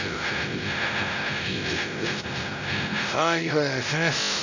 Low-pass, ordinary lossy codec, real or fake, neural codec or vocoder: 7.2 kHz; none; fake; codec, 16 kHz, 0.5 kbps, X-Codec, WavLM features, trained on Multilingual LibriSpeech